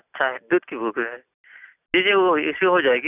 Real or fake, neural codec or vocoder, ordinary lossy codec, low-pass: real; none; none; 3.6 kHz